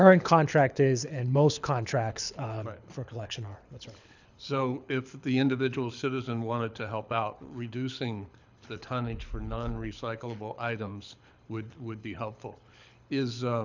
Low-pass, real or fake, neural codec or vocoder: 7.2 kHz; fake; codec, 24 kHz, 6 kbps, HILCodec